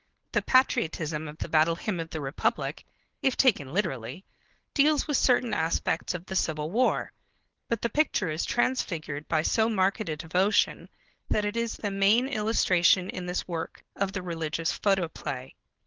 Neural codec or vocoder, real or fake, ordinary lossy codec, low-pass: codec, 16 kHz, 4.8 kbps, FACodec; fake; Opus, 16 kbps; 7.2 kHz